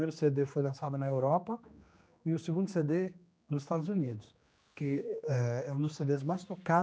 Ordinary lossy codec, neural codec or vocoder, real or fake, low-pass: none; codec, 16 kHz, 2 kbps, X-Codec, HuBERT features, trained on general audio; fake; none